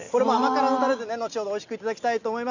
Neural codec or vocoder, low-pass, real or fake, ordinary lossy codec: none; 7.2 kHz; real; none